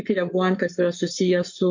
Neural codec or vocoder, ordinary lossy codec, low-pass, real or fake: codec, 44.1 kHz, 7.8 kbps, Pupu-Codec; MP3, 48 kbps; 7.2 kHz; fake